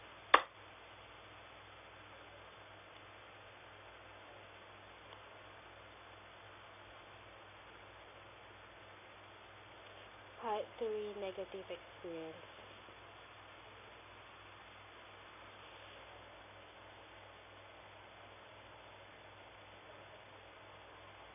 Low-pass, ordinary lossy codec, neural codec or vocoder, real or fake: 3.6 kHz; none; none; real